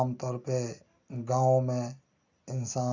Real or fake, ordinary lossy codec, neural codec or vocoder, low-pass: real; none; none; 7.2 kHz